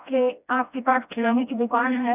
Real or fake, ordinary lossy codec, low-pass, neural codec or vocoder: fake; none; 3.6 kHz; codec, 16 kHz, 1 kbps, FreqCodec, smaller model